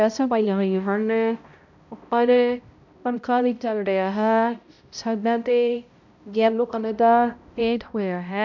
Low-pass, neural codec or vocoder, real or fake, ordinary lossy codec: 7.2 kHz; codec, 16 kHz, 0.5 kbps, X-Codec, HuBERT features, trained on balanced general audio; fake; none